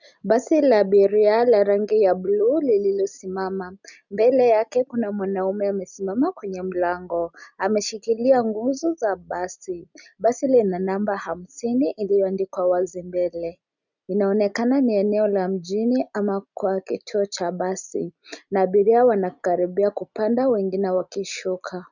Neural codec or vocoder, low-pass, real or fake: none; 7.2 kHz; real